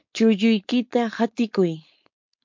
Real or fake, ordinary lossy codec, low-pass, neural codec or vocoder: fake; MP3, 48 kbps; 7.2 kHz; codec, 16 kHz, 4.8 kbps, FACodec